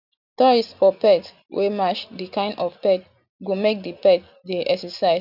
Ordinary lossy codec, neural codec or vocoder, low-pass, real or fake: none; none; 5.4 kHz; real